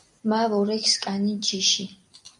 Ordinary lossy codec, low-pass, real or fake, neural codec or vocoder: MP3, 96 kbps; 10.8 kHz; fake; vocoder, 44.1 kHz, 128 mel bands every 256 samples, BigVGAN v2